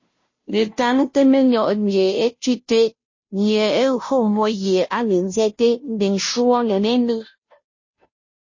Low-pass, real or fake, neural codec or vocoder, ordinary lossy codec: 7.2 kHz; fake; codec, 16 kHz, 0.5 kbps, FunCodec, trained on Chinese and English, 25 frames a second; MP3, 32 kbps